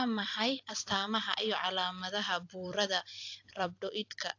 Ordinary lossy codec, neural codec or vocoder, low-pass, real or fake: AAC, 48 kbps; vocoder, 44.1 kHz, 128 mel bands every 256 samples, BigVGAN v2; 7.2 kHz; fake